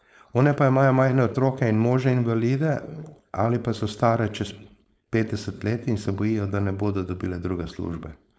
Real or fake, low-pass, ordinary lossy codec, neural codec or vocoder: fake; none; none; codec, 16 kHz, 4.8 kbps, FACodec